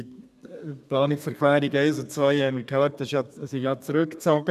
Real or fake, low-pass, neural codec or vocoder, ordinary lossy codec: fake; 14.4 kHz; codec, 32 kHz, 1.9 kbps, SNAC; none